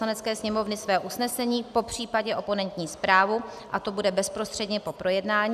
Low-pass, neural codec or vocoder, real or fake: 14.4 kHz; none; real